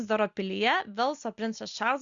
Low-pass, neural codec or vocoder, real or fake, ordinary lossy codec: 7.2 kHz; none; real; Opus, 64 kbps